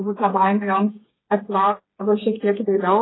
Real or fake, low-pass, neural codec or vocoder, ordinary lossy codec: fake; 7.2 kHz; codec, 16 kHz, 4 kbps, FreqCodec, smaller model; AAC, 16 kbps